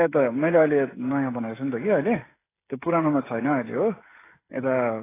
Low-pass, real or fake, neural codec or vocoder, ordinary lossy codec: 3.6 kHz; fake; codec, 16 kHz, 16 kbps, FreqCodec, smaller model; AAC, 16 kbps